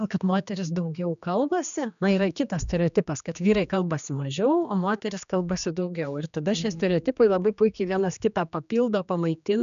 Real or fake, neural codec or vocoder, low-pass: fake; codec, 16 kHz, 2 kbps, X-Codec, HuBERT features, trained on general audio; 7.2 kHz